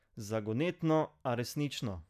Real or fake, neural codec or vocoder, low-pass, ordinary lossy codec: real; none; 14.4 kHz; none